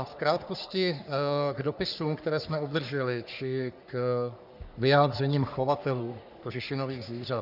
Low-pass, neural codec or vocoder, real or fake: 5.4 kHz; codec, 44.1 kHz, 3.4 kbps, Pupu-Codec; fake